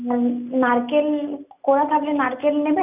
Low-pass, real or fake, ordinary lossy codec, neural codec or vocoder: 3.6 kHz; real; none; none